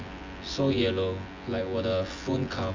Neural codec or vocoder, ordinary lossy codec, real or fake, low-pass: vocoder, 24 kHz, 100 mel bands, Vocos; MP3, 64 kbps; fake; 7.2 kHz